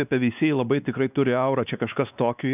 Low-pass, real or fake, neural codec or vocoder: 3.6 kHz; fake; codec, 16 kHz, 2 kbps, X-Codec, WavLM features, trained on Multilingual LibriSpeech